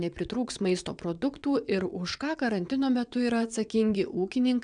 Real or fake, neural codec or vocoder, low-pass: fake; vocoder, 22.05 kHz, 80 mel bands, WaveNeXt; 9.9 kHz